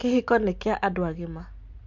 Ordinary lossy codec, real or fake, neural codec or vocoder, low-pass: MP3, 64 kbps; real; none; 7.2 kHz